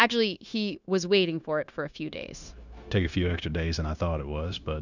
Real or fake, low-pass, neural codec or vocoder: fake; 7.2 kHz; codec, 16 kHz, 0.9 kbps, LongCat-Audio-Codec